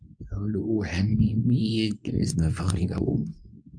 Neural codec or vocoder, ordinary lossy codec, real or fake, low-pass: codec, 24 kHz, 0.9 kbps, WavTokenizer, medium speech release version 2; none; fake; 9.9 kHz